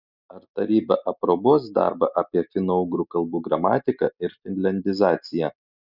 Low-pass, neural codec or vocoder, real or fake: 5.4 kHz; none; real